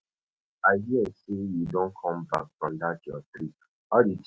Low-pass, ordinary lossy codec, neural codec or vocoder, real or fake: none; none; none; real